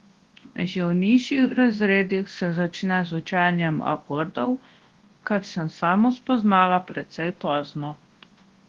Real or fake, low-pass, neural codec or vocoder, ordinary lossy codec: fake; 10.8 kHz; codec, 24 kHz, 0.9 kbps, WavTokenizer, large speech release; Opus, 16 kbps